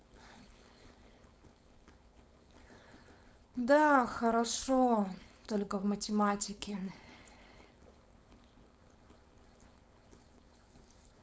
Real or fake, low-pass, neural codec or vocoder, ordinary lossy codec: fake; none; codec, 16 kHz, 4.8 kbps, FACodec; none